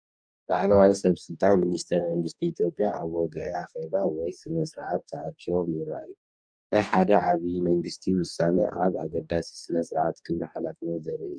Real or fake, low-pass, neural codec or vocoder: fake; 9.9 kHz; codec, 44.1 kHz, 2.6 kbps, DAC